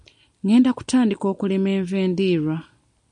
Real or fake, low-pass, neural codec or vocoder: real; 10.8 kHz; none